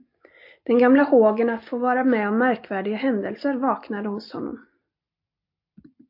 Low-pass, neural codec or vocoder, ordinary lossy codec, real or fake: 5.4 kHz; none; MP3, 32 kbps; real